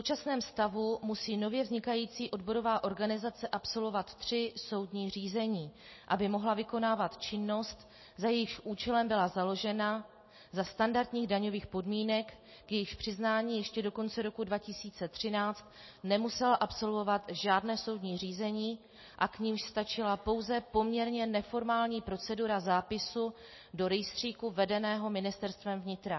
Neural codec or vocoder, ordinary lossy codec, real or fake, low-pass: none; MP3, 24 kbps; real; 7.2 kHz